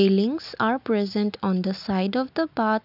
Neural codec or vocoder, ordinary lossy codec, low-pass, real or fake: none; none; 5.4 kHz; real